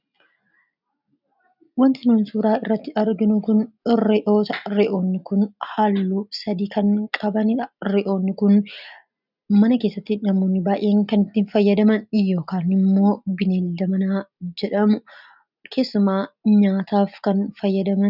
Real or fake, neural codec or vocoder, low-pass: real; none; 5.4 kHz